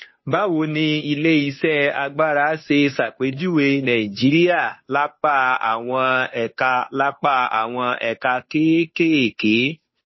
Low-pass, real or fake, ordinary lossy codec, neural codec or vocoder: 7.2 kHz; fake; MP3, 24 kbps; codec, 16 kHz, 2 kbps, FunCodec, trained on Chinese and English, 25 frames a second